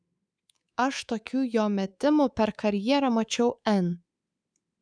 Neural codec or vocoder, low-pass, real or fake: codec, 24 kHz, 3.1 kbps, DualCodec; 9.9 kHz; fake